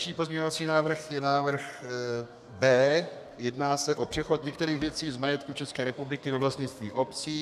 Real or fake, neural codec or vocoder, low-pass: fake; codec, 32 kHz, 1.9 kbps, SNAC; 14.4 kHz